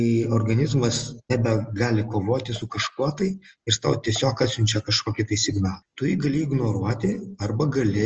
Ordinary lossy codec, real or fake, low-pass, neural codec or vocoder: Opus, 24 kbps; real; 7.2 kHz; none